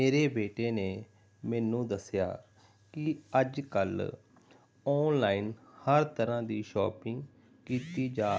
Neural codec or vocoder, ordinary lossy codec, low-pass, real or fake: none; none; none; real